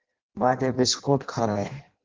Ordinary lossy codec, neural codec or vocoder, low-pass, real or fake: Opus, 16 kbps; codec, 16 kHz in and 24 kHz out, 0.6 kbps, FireRedTTS-2 codec; 7.2 kHz; fake